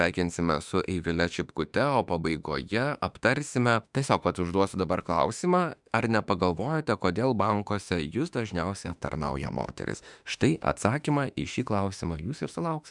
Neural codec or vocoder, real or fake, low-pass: autoencoder, 48 kHz, 32 numbers a frame, DAC-VAE, trained on Japanese speech; fake; 10.8 kHz